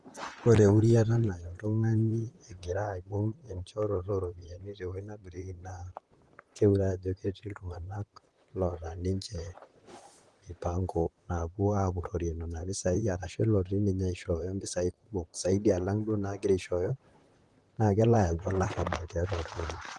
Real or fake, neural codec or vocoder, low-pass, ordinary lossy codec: fake; vocoder, 44.1 kHz, 128 mel bands, Pupu-Vocoder; 10.8 kHz; Opus, 24 kbps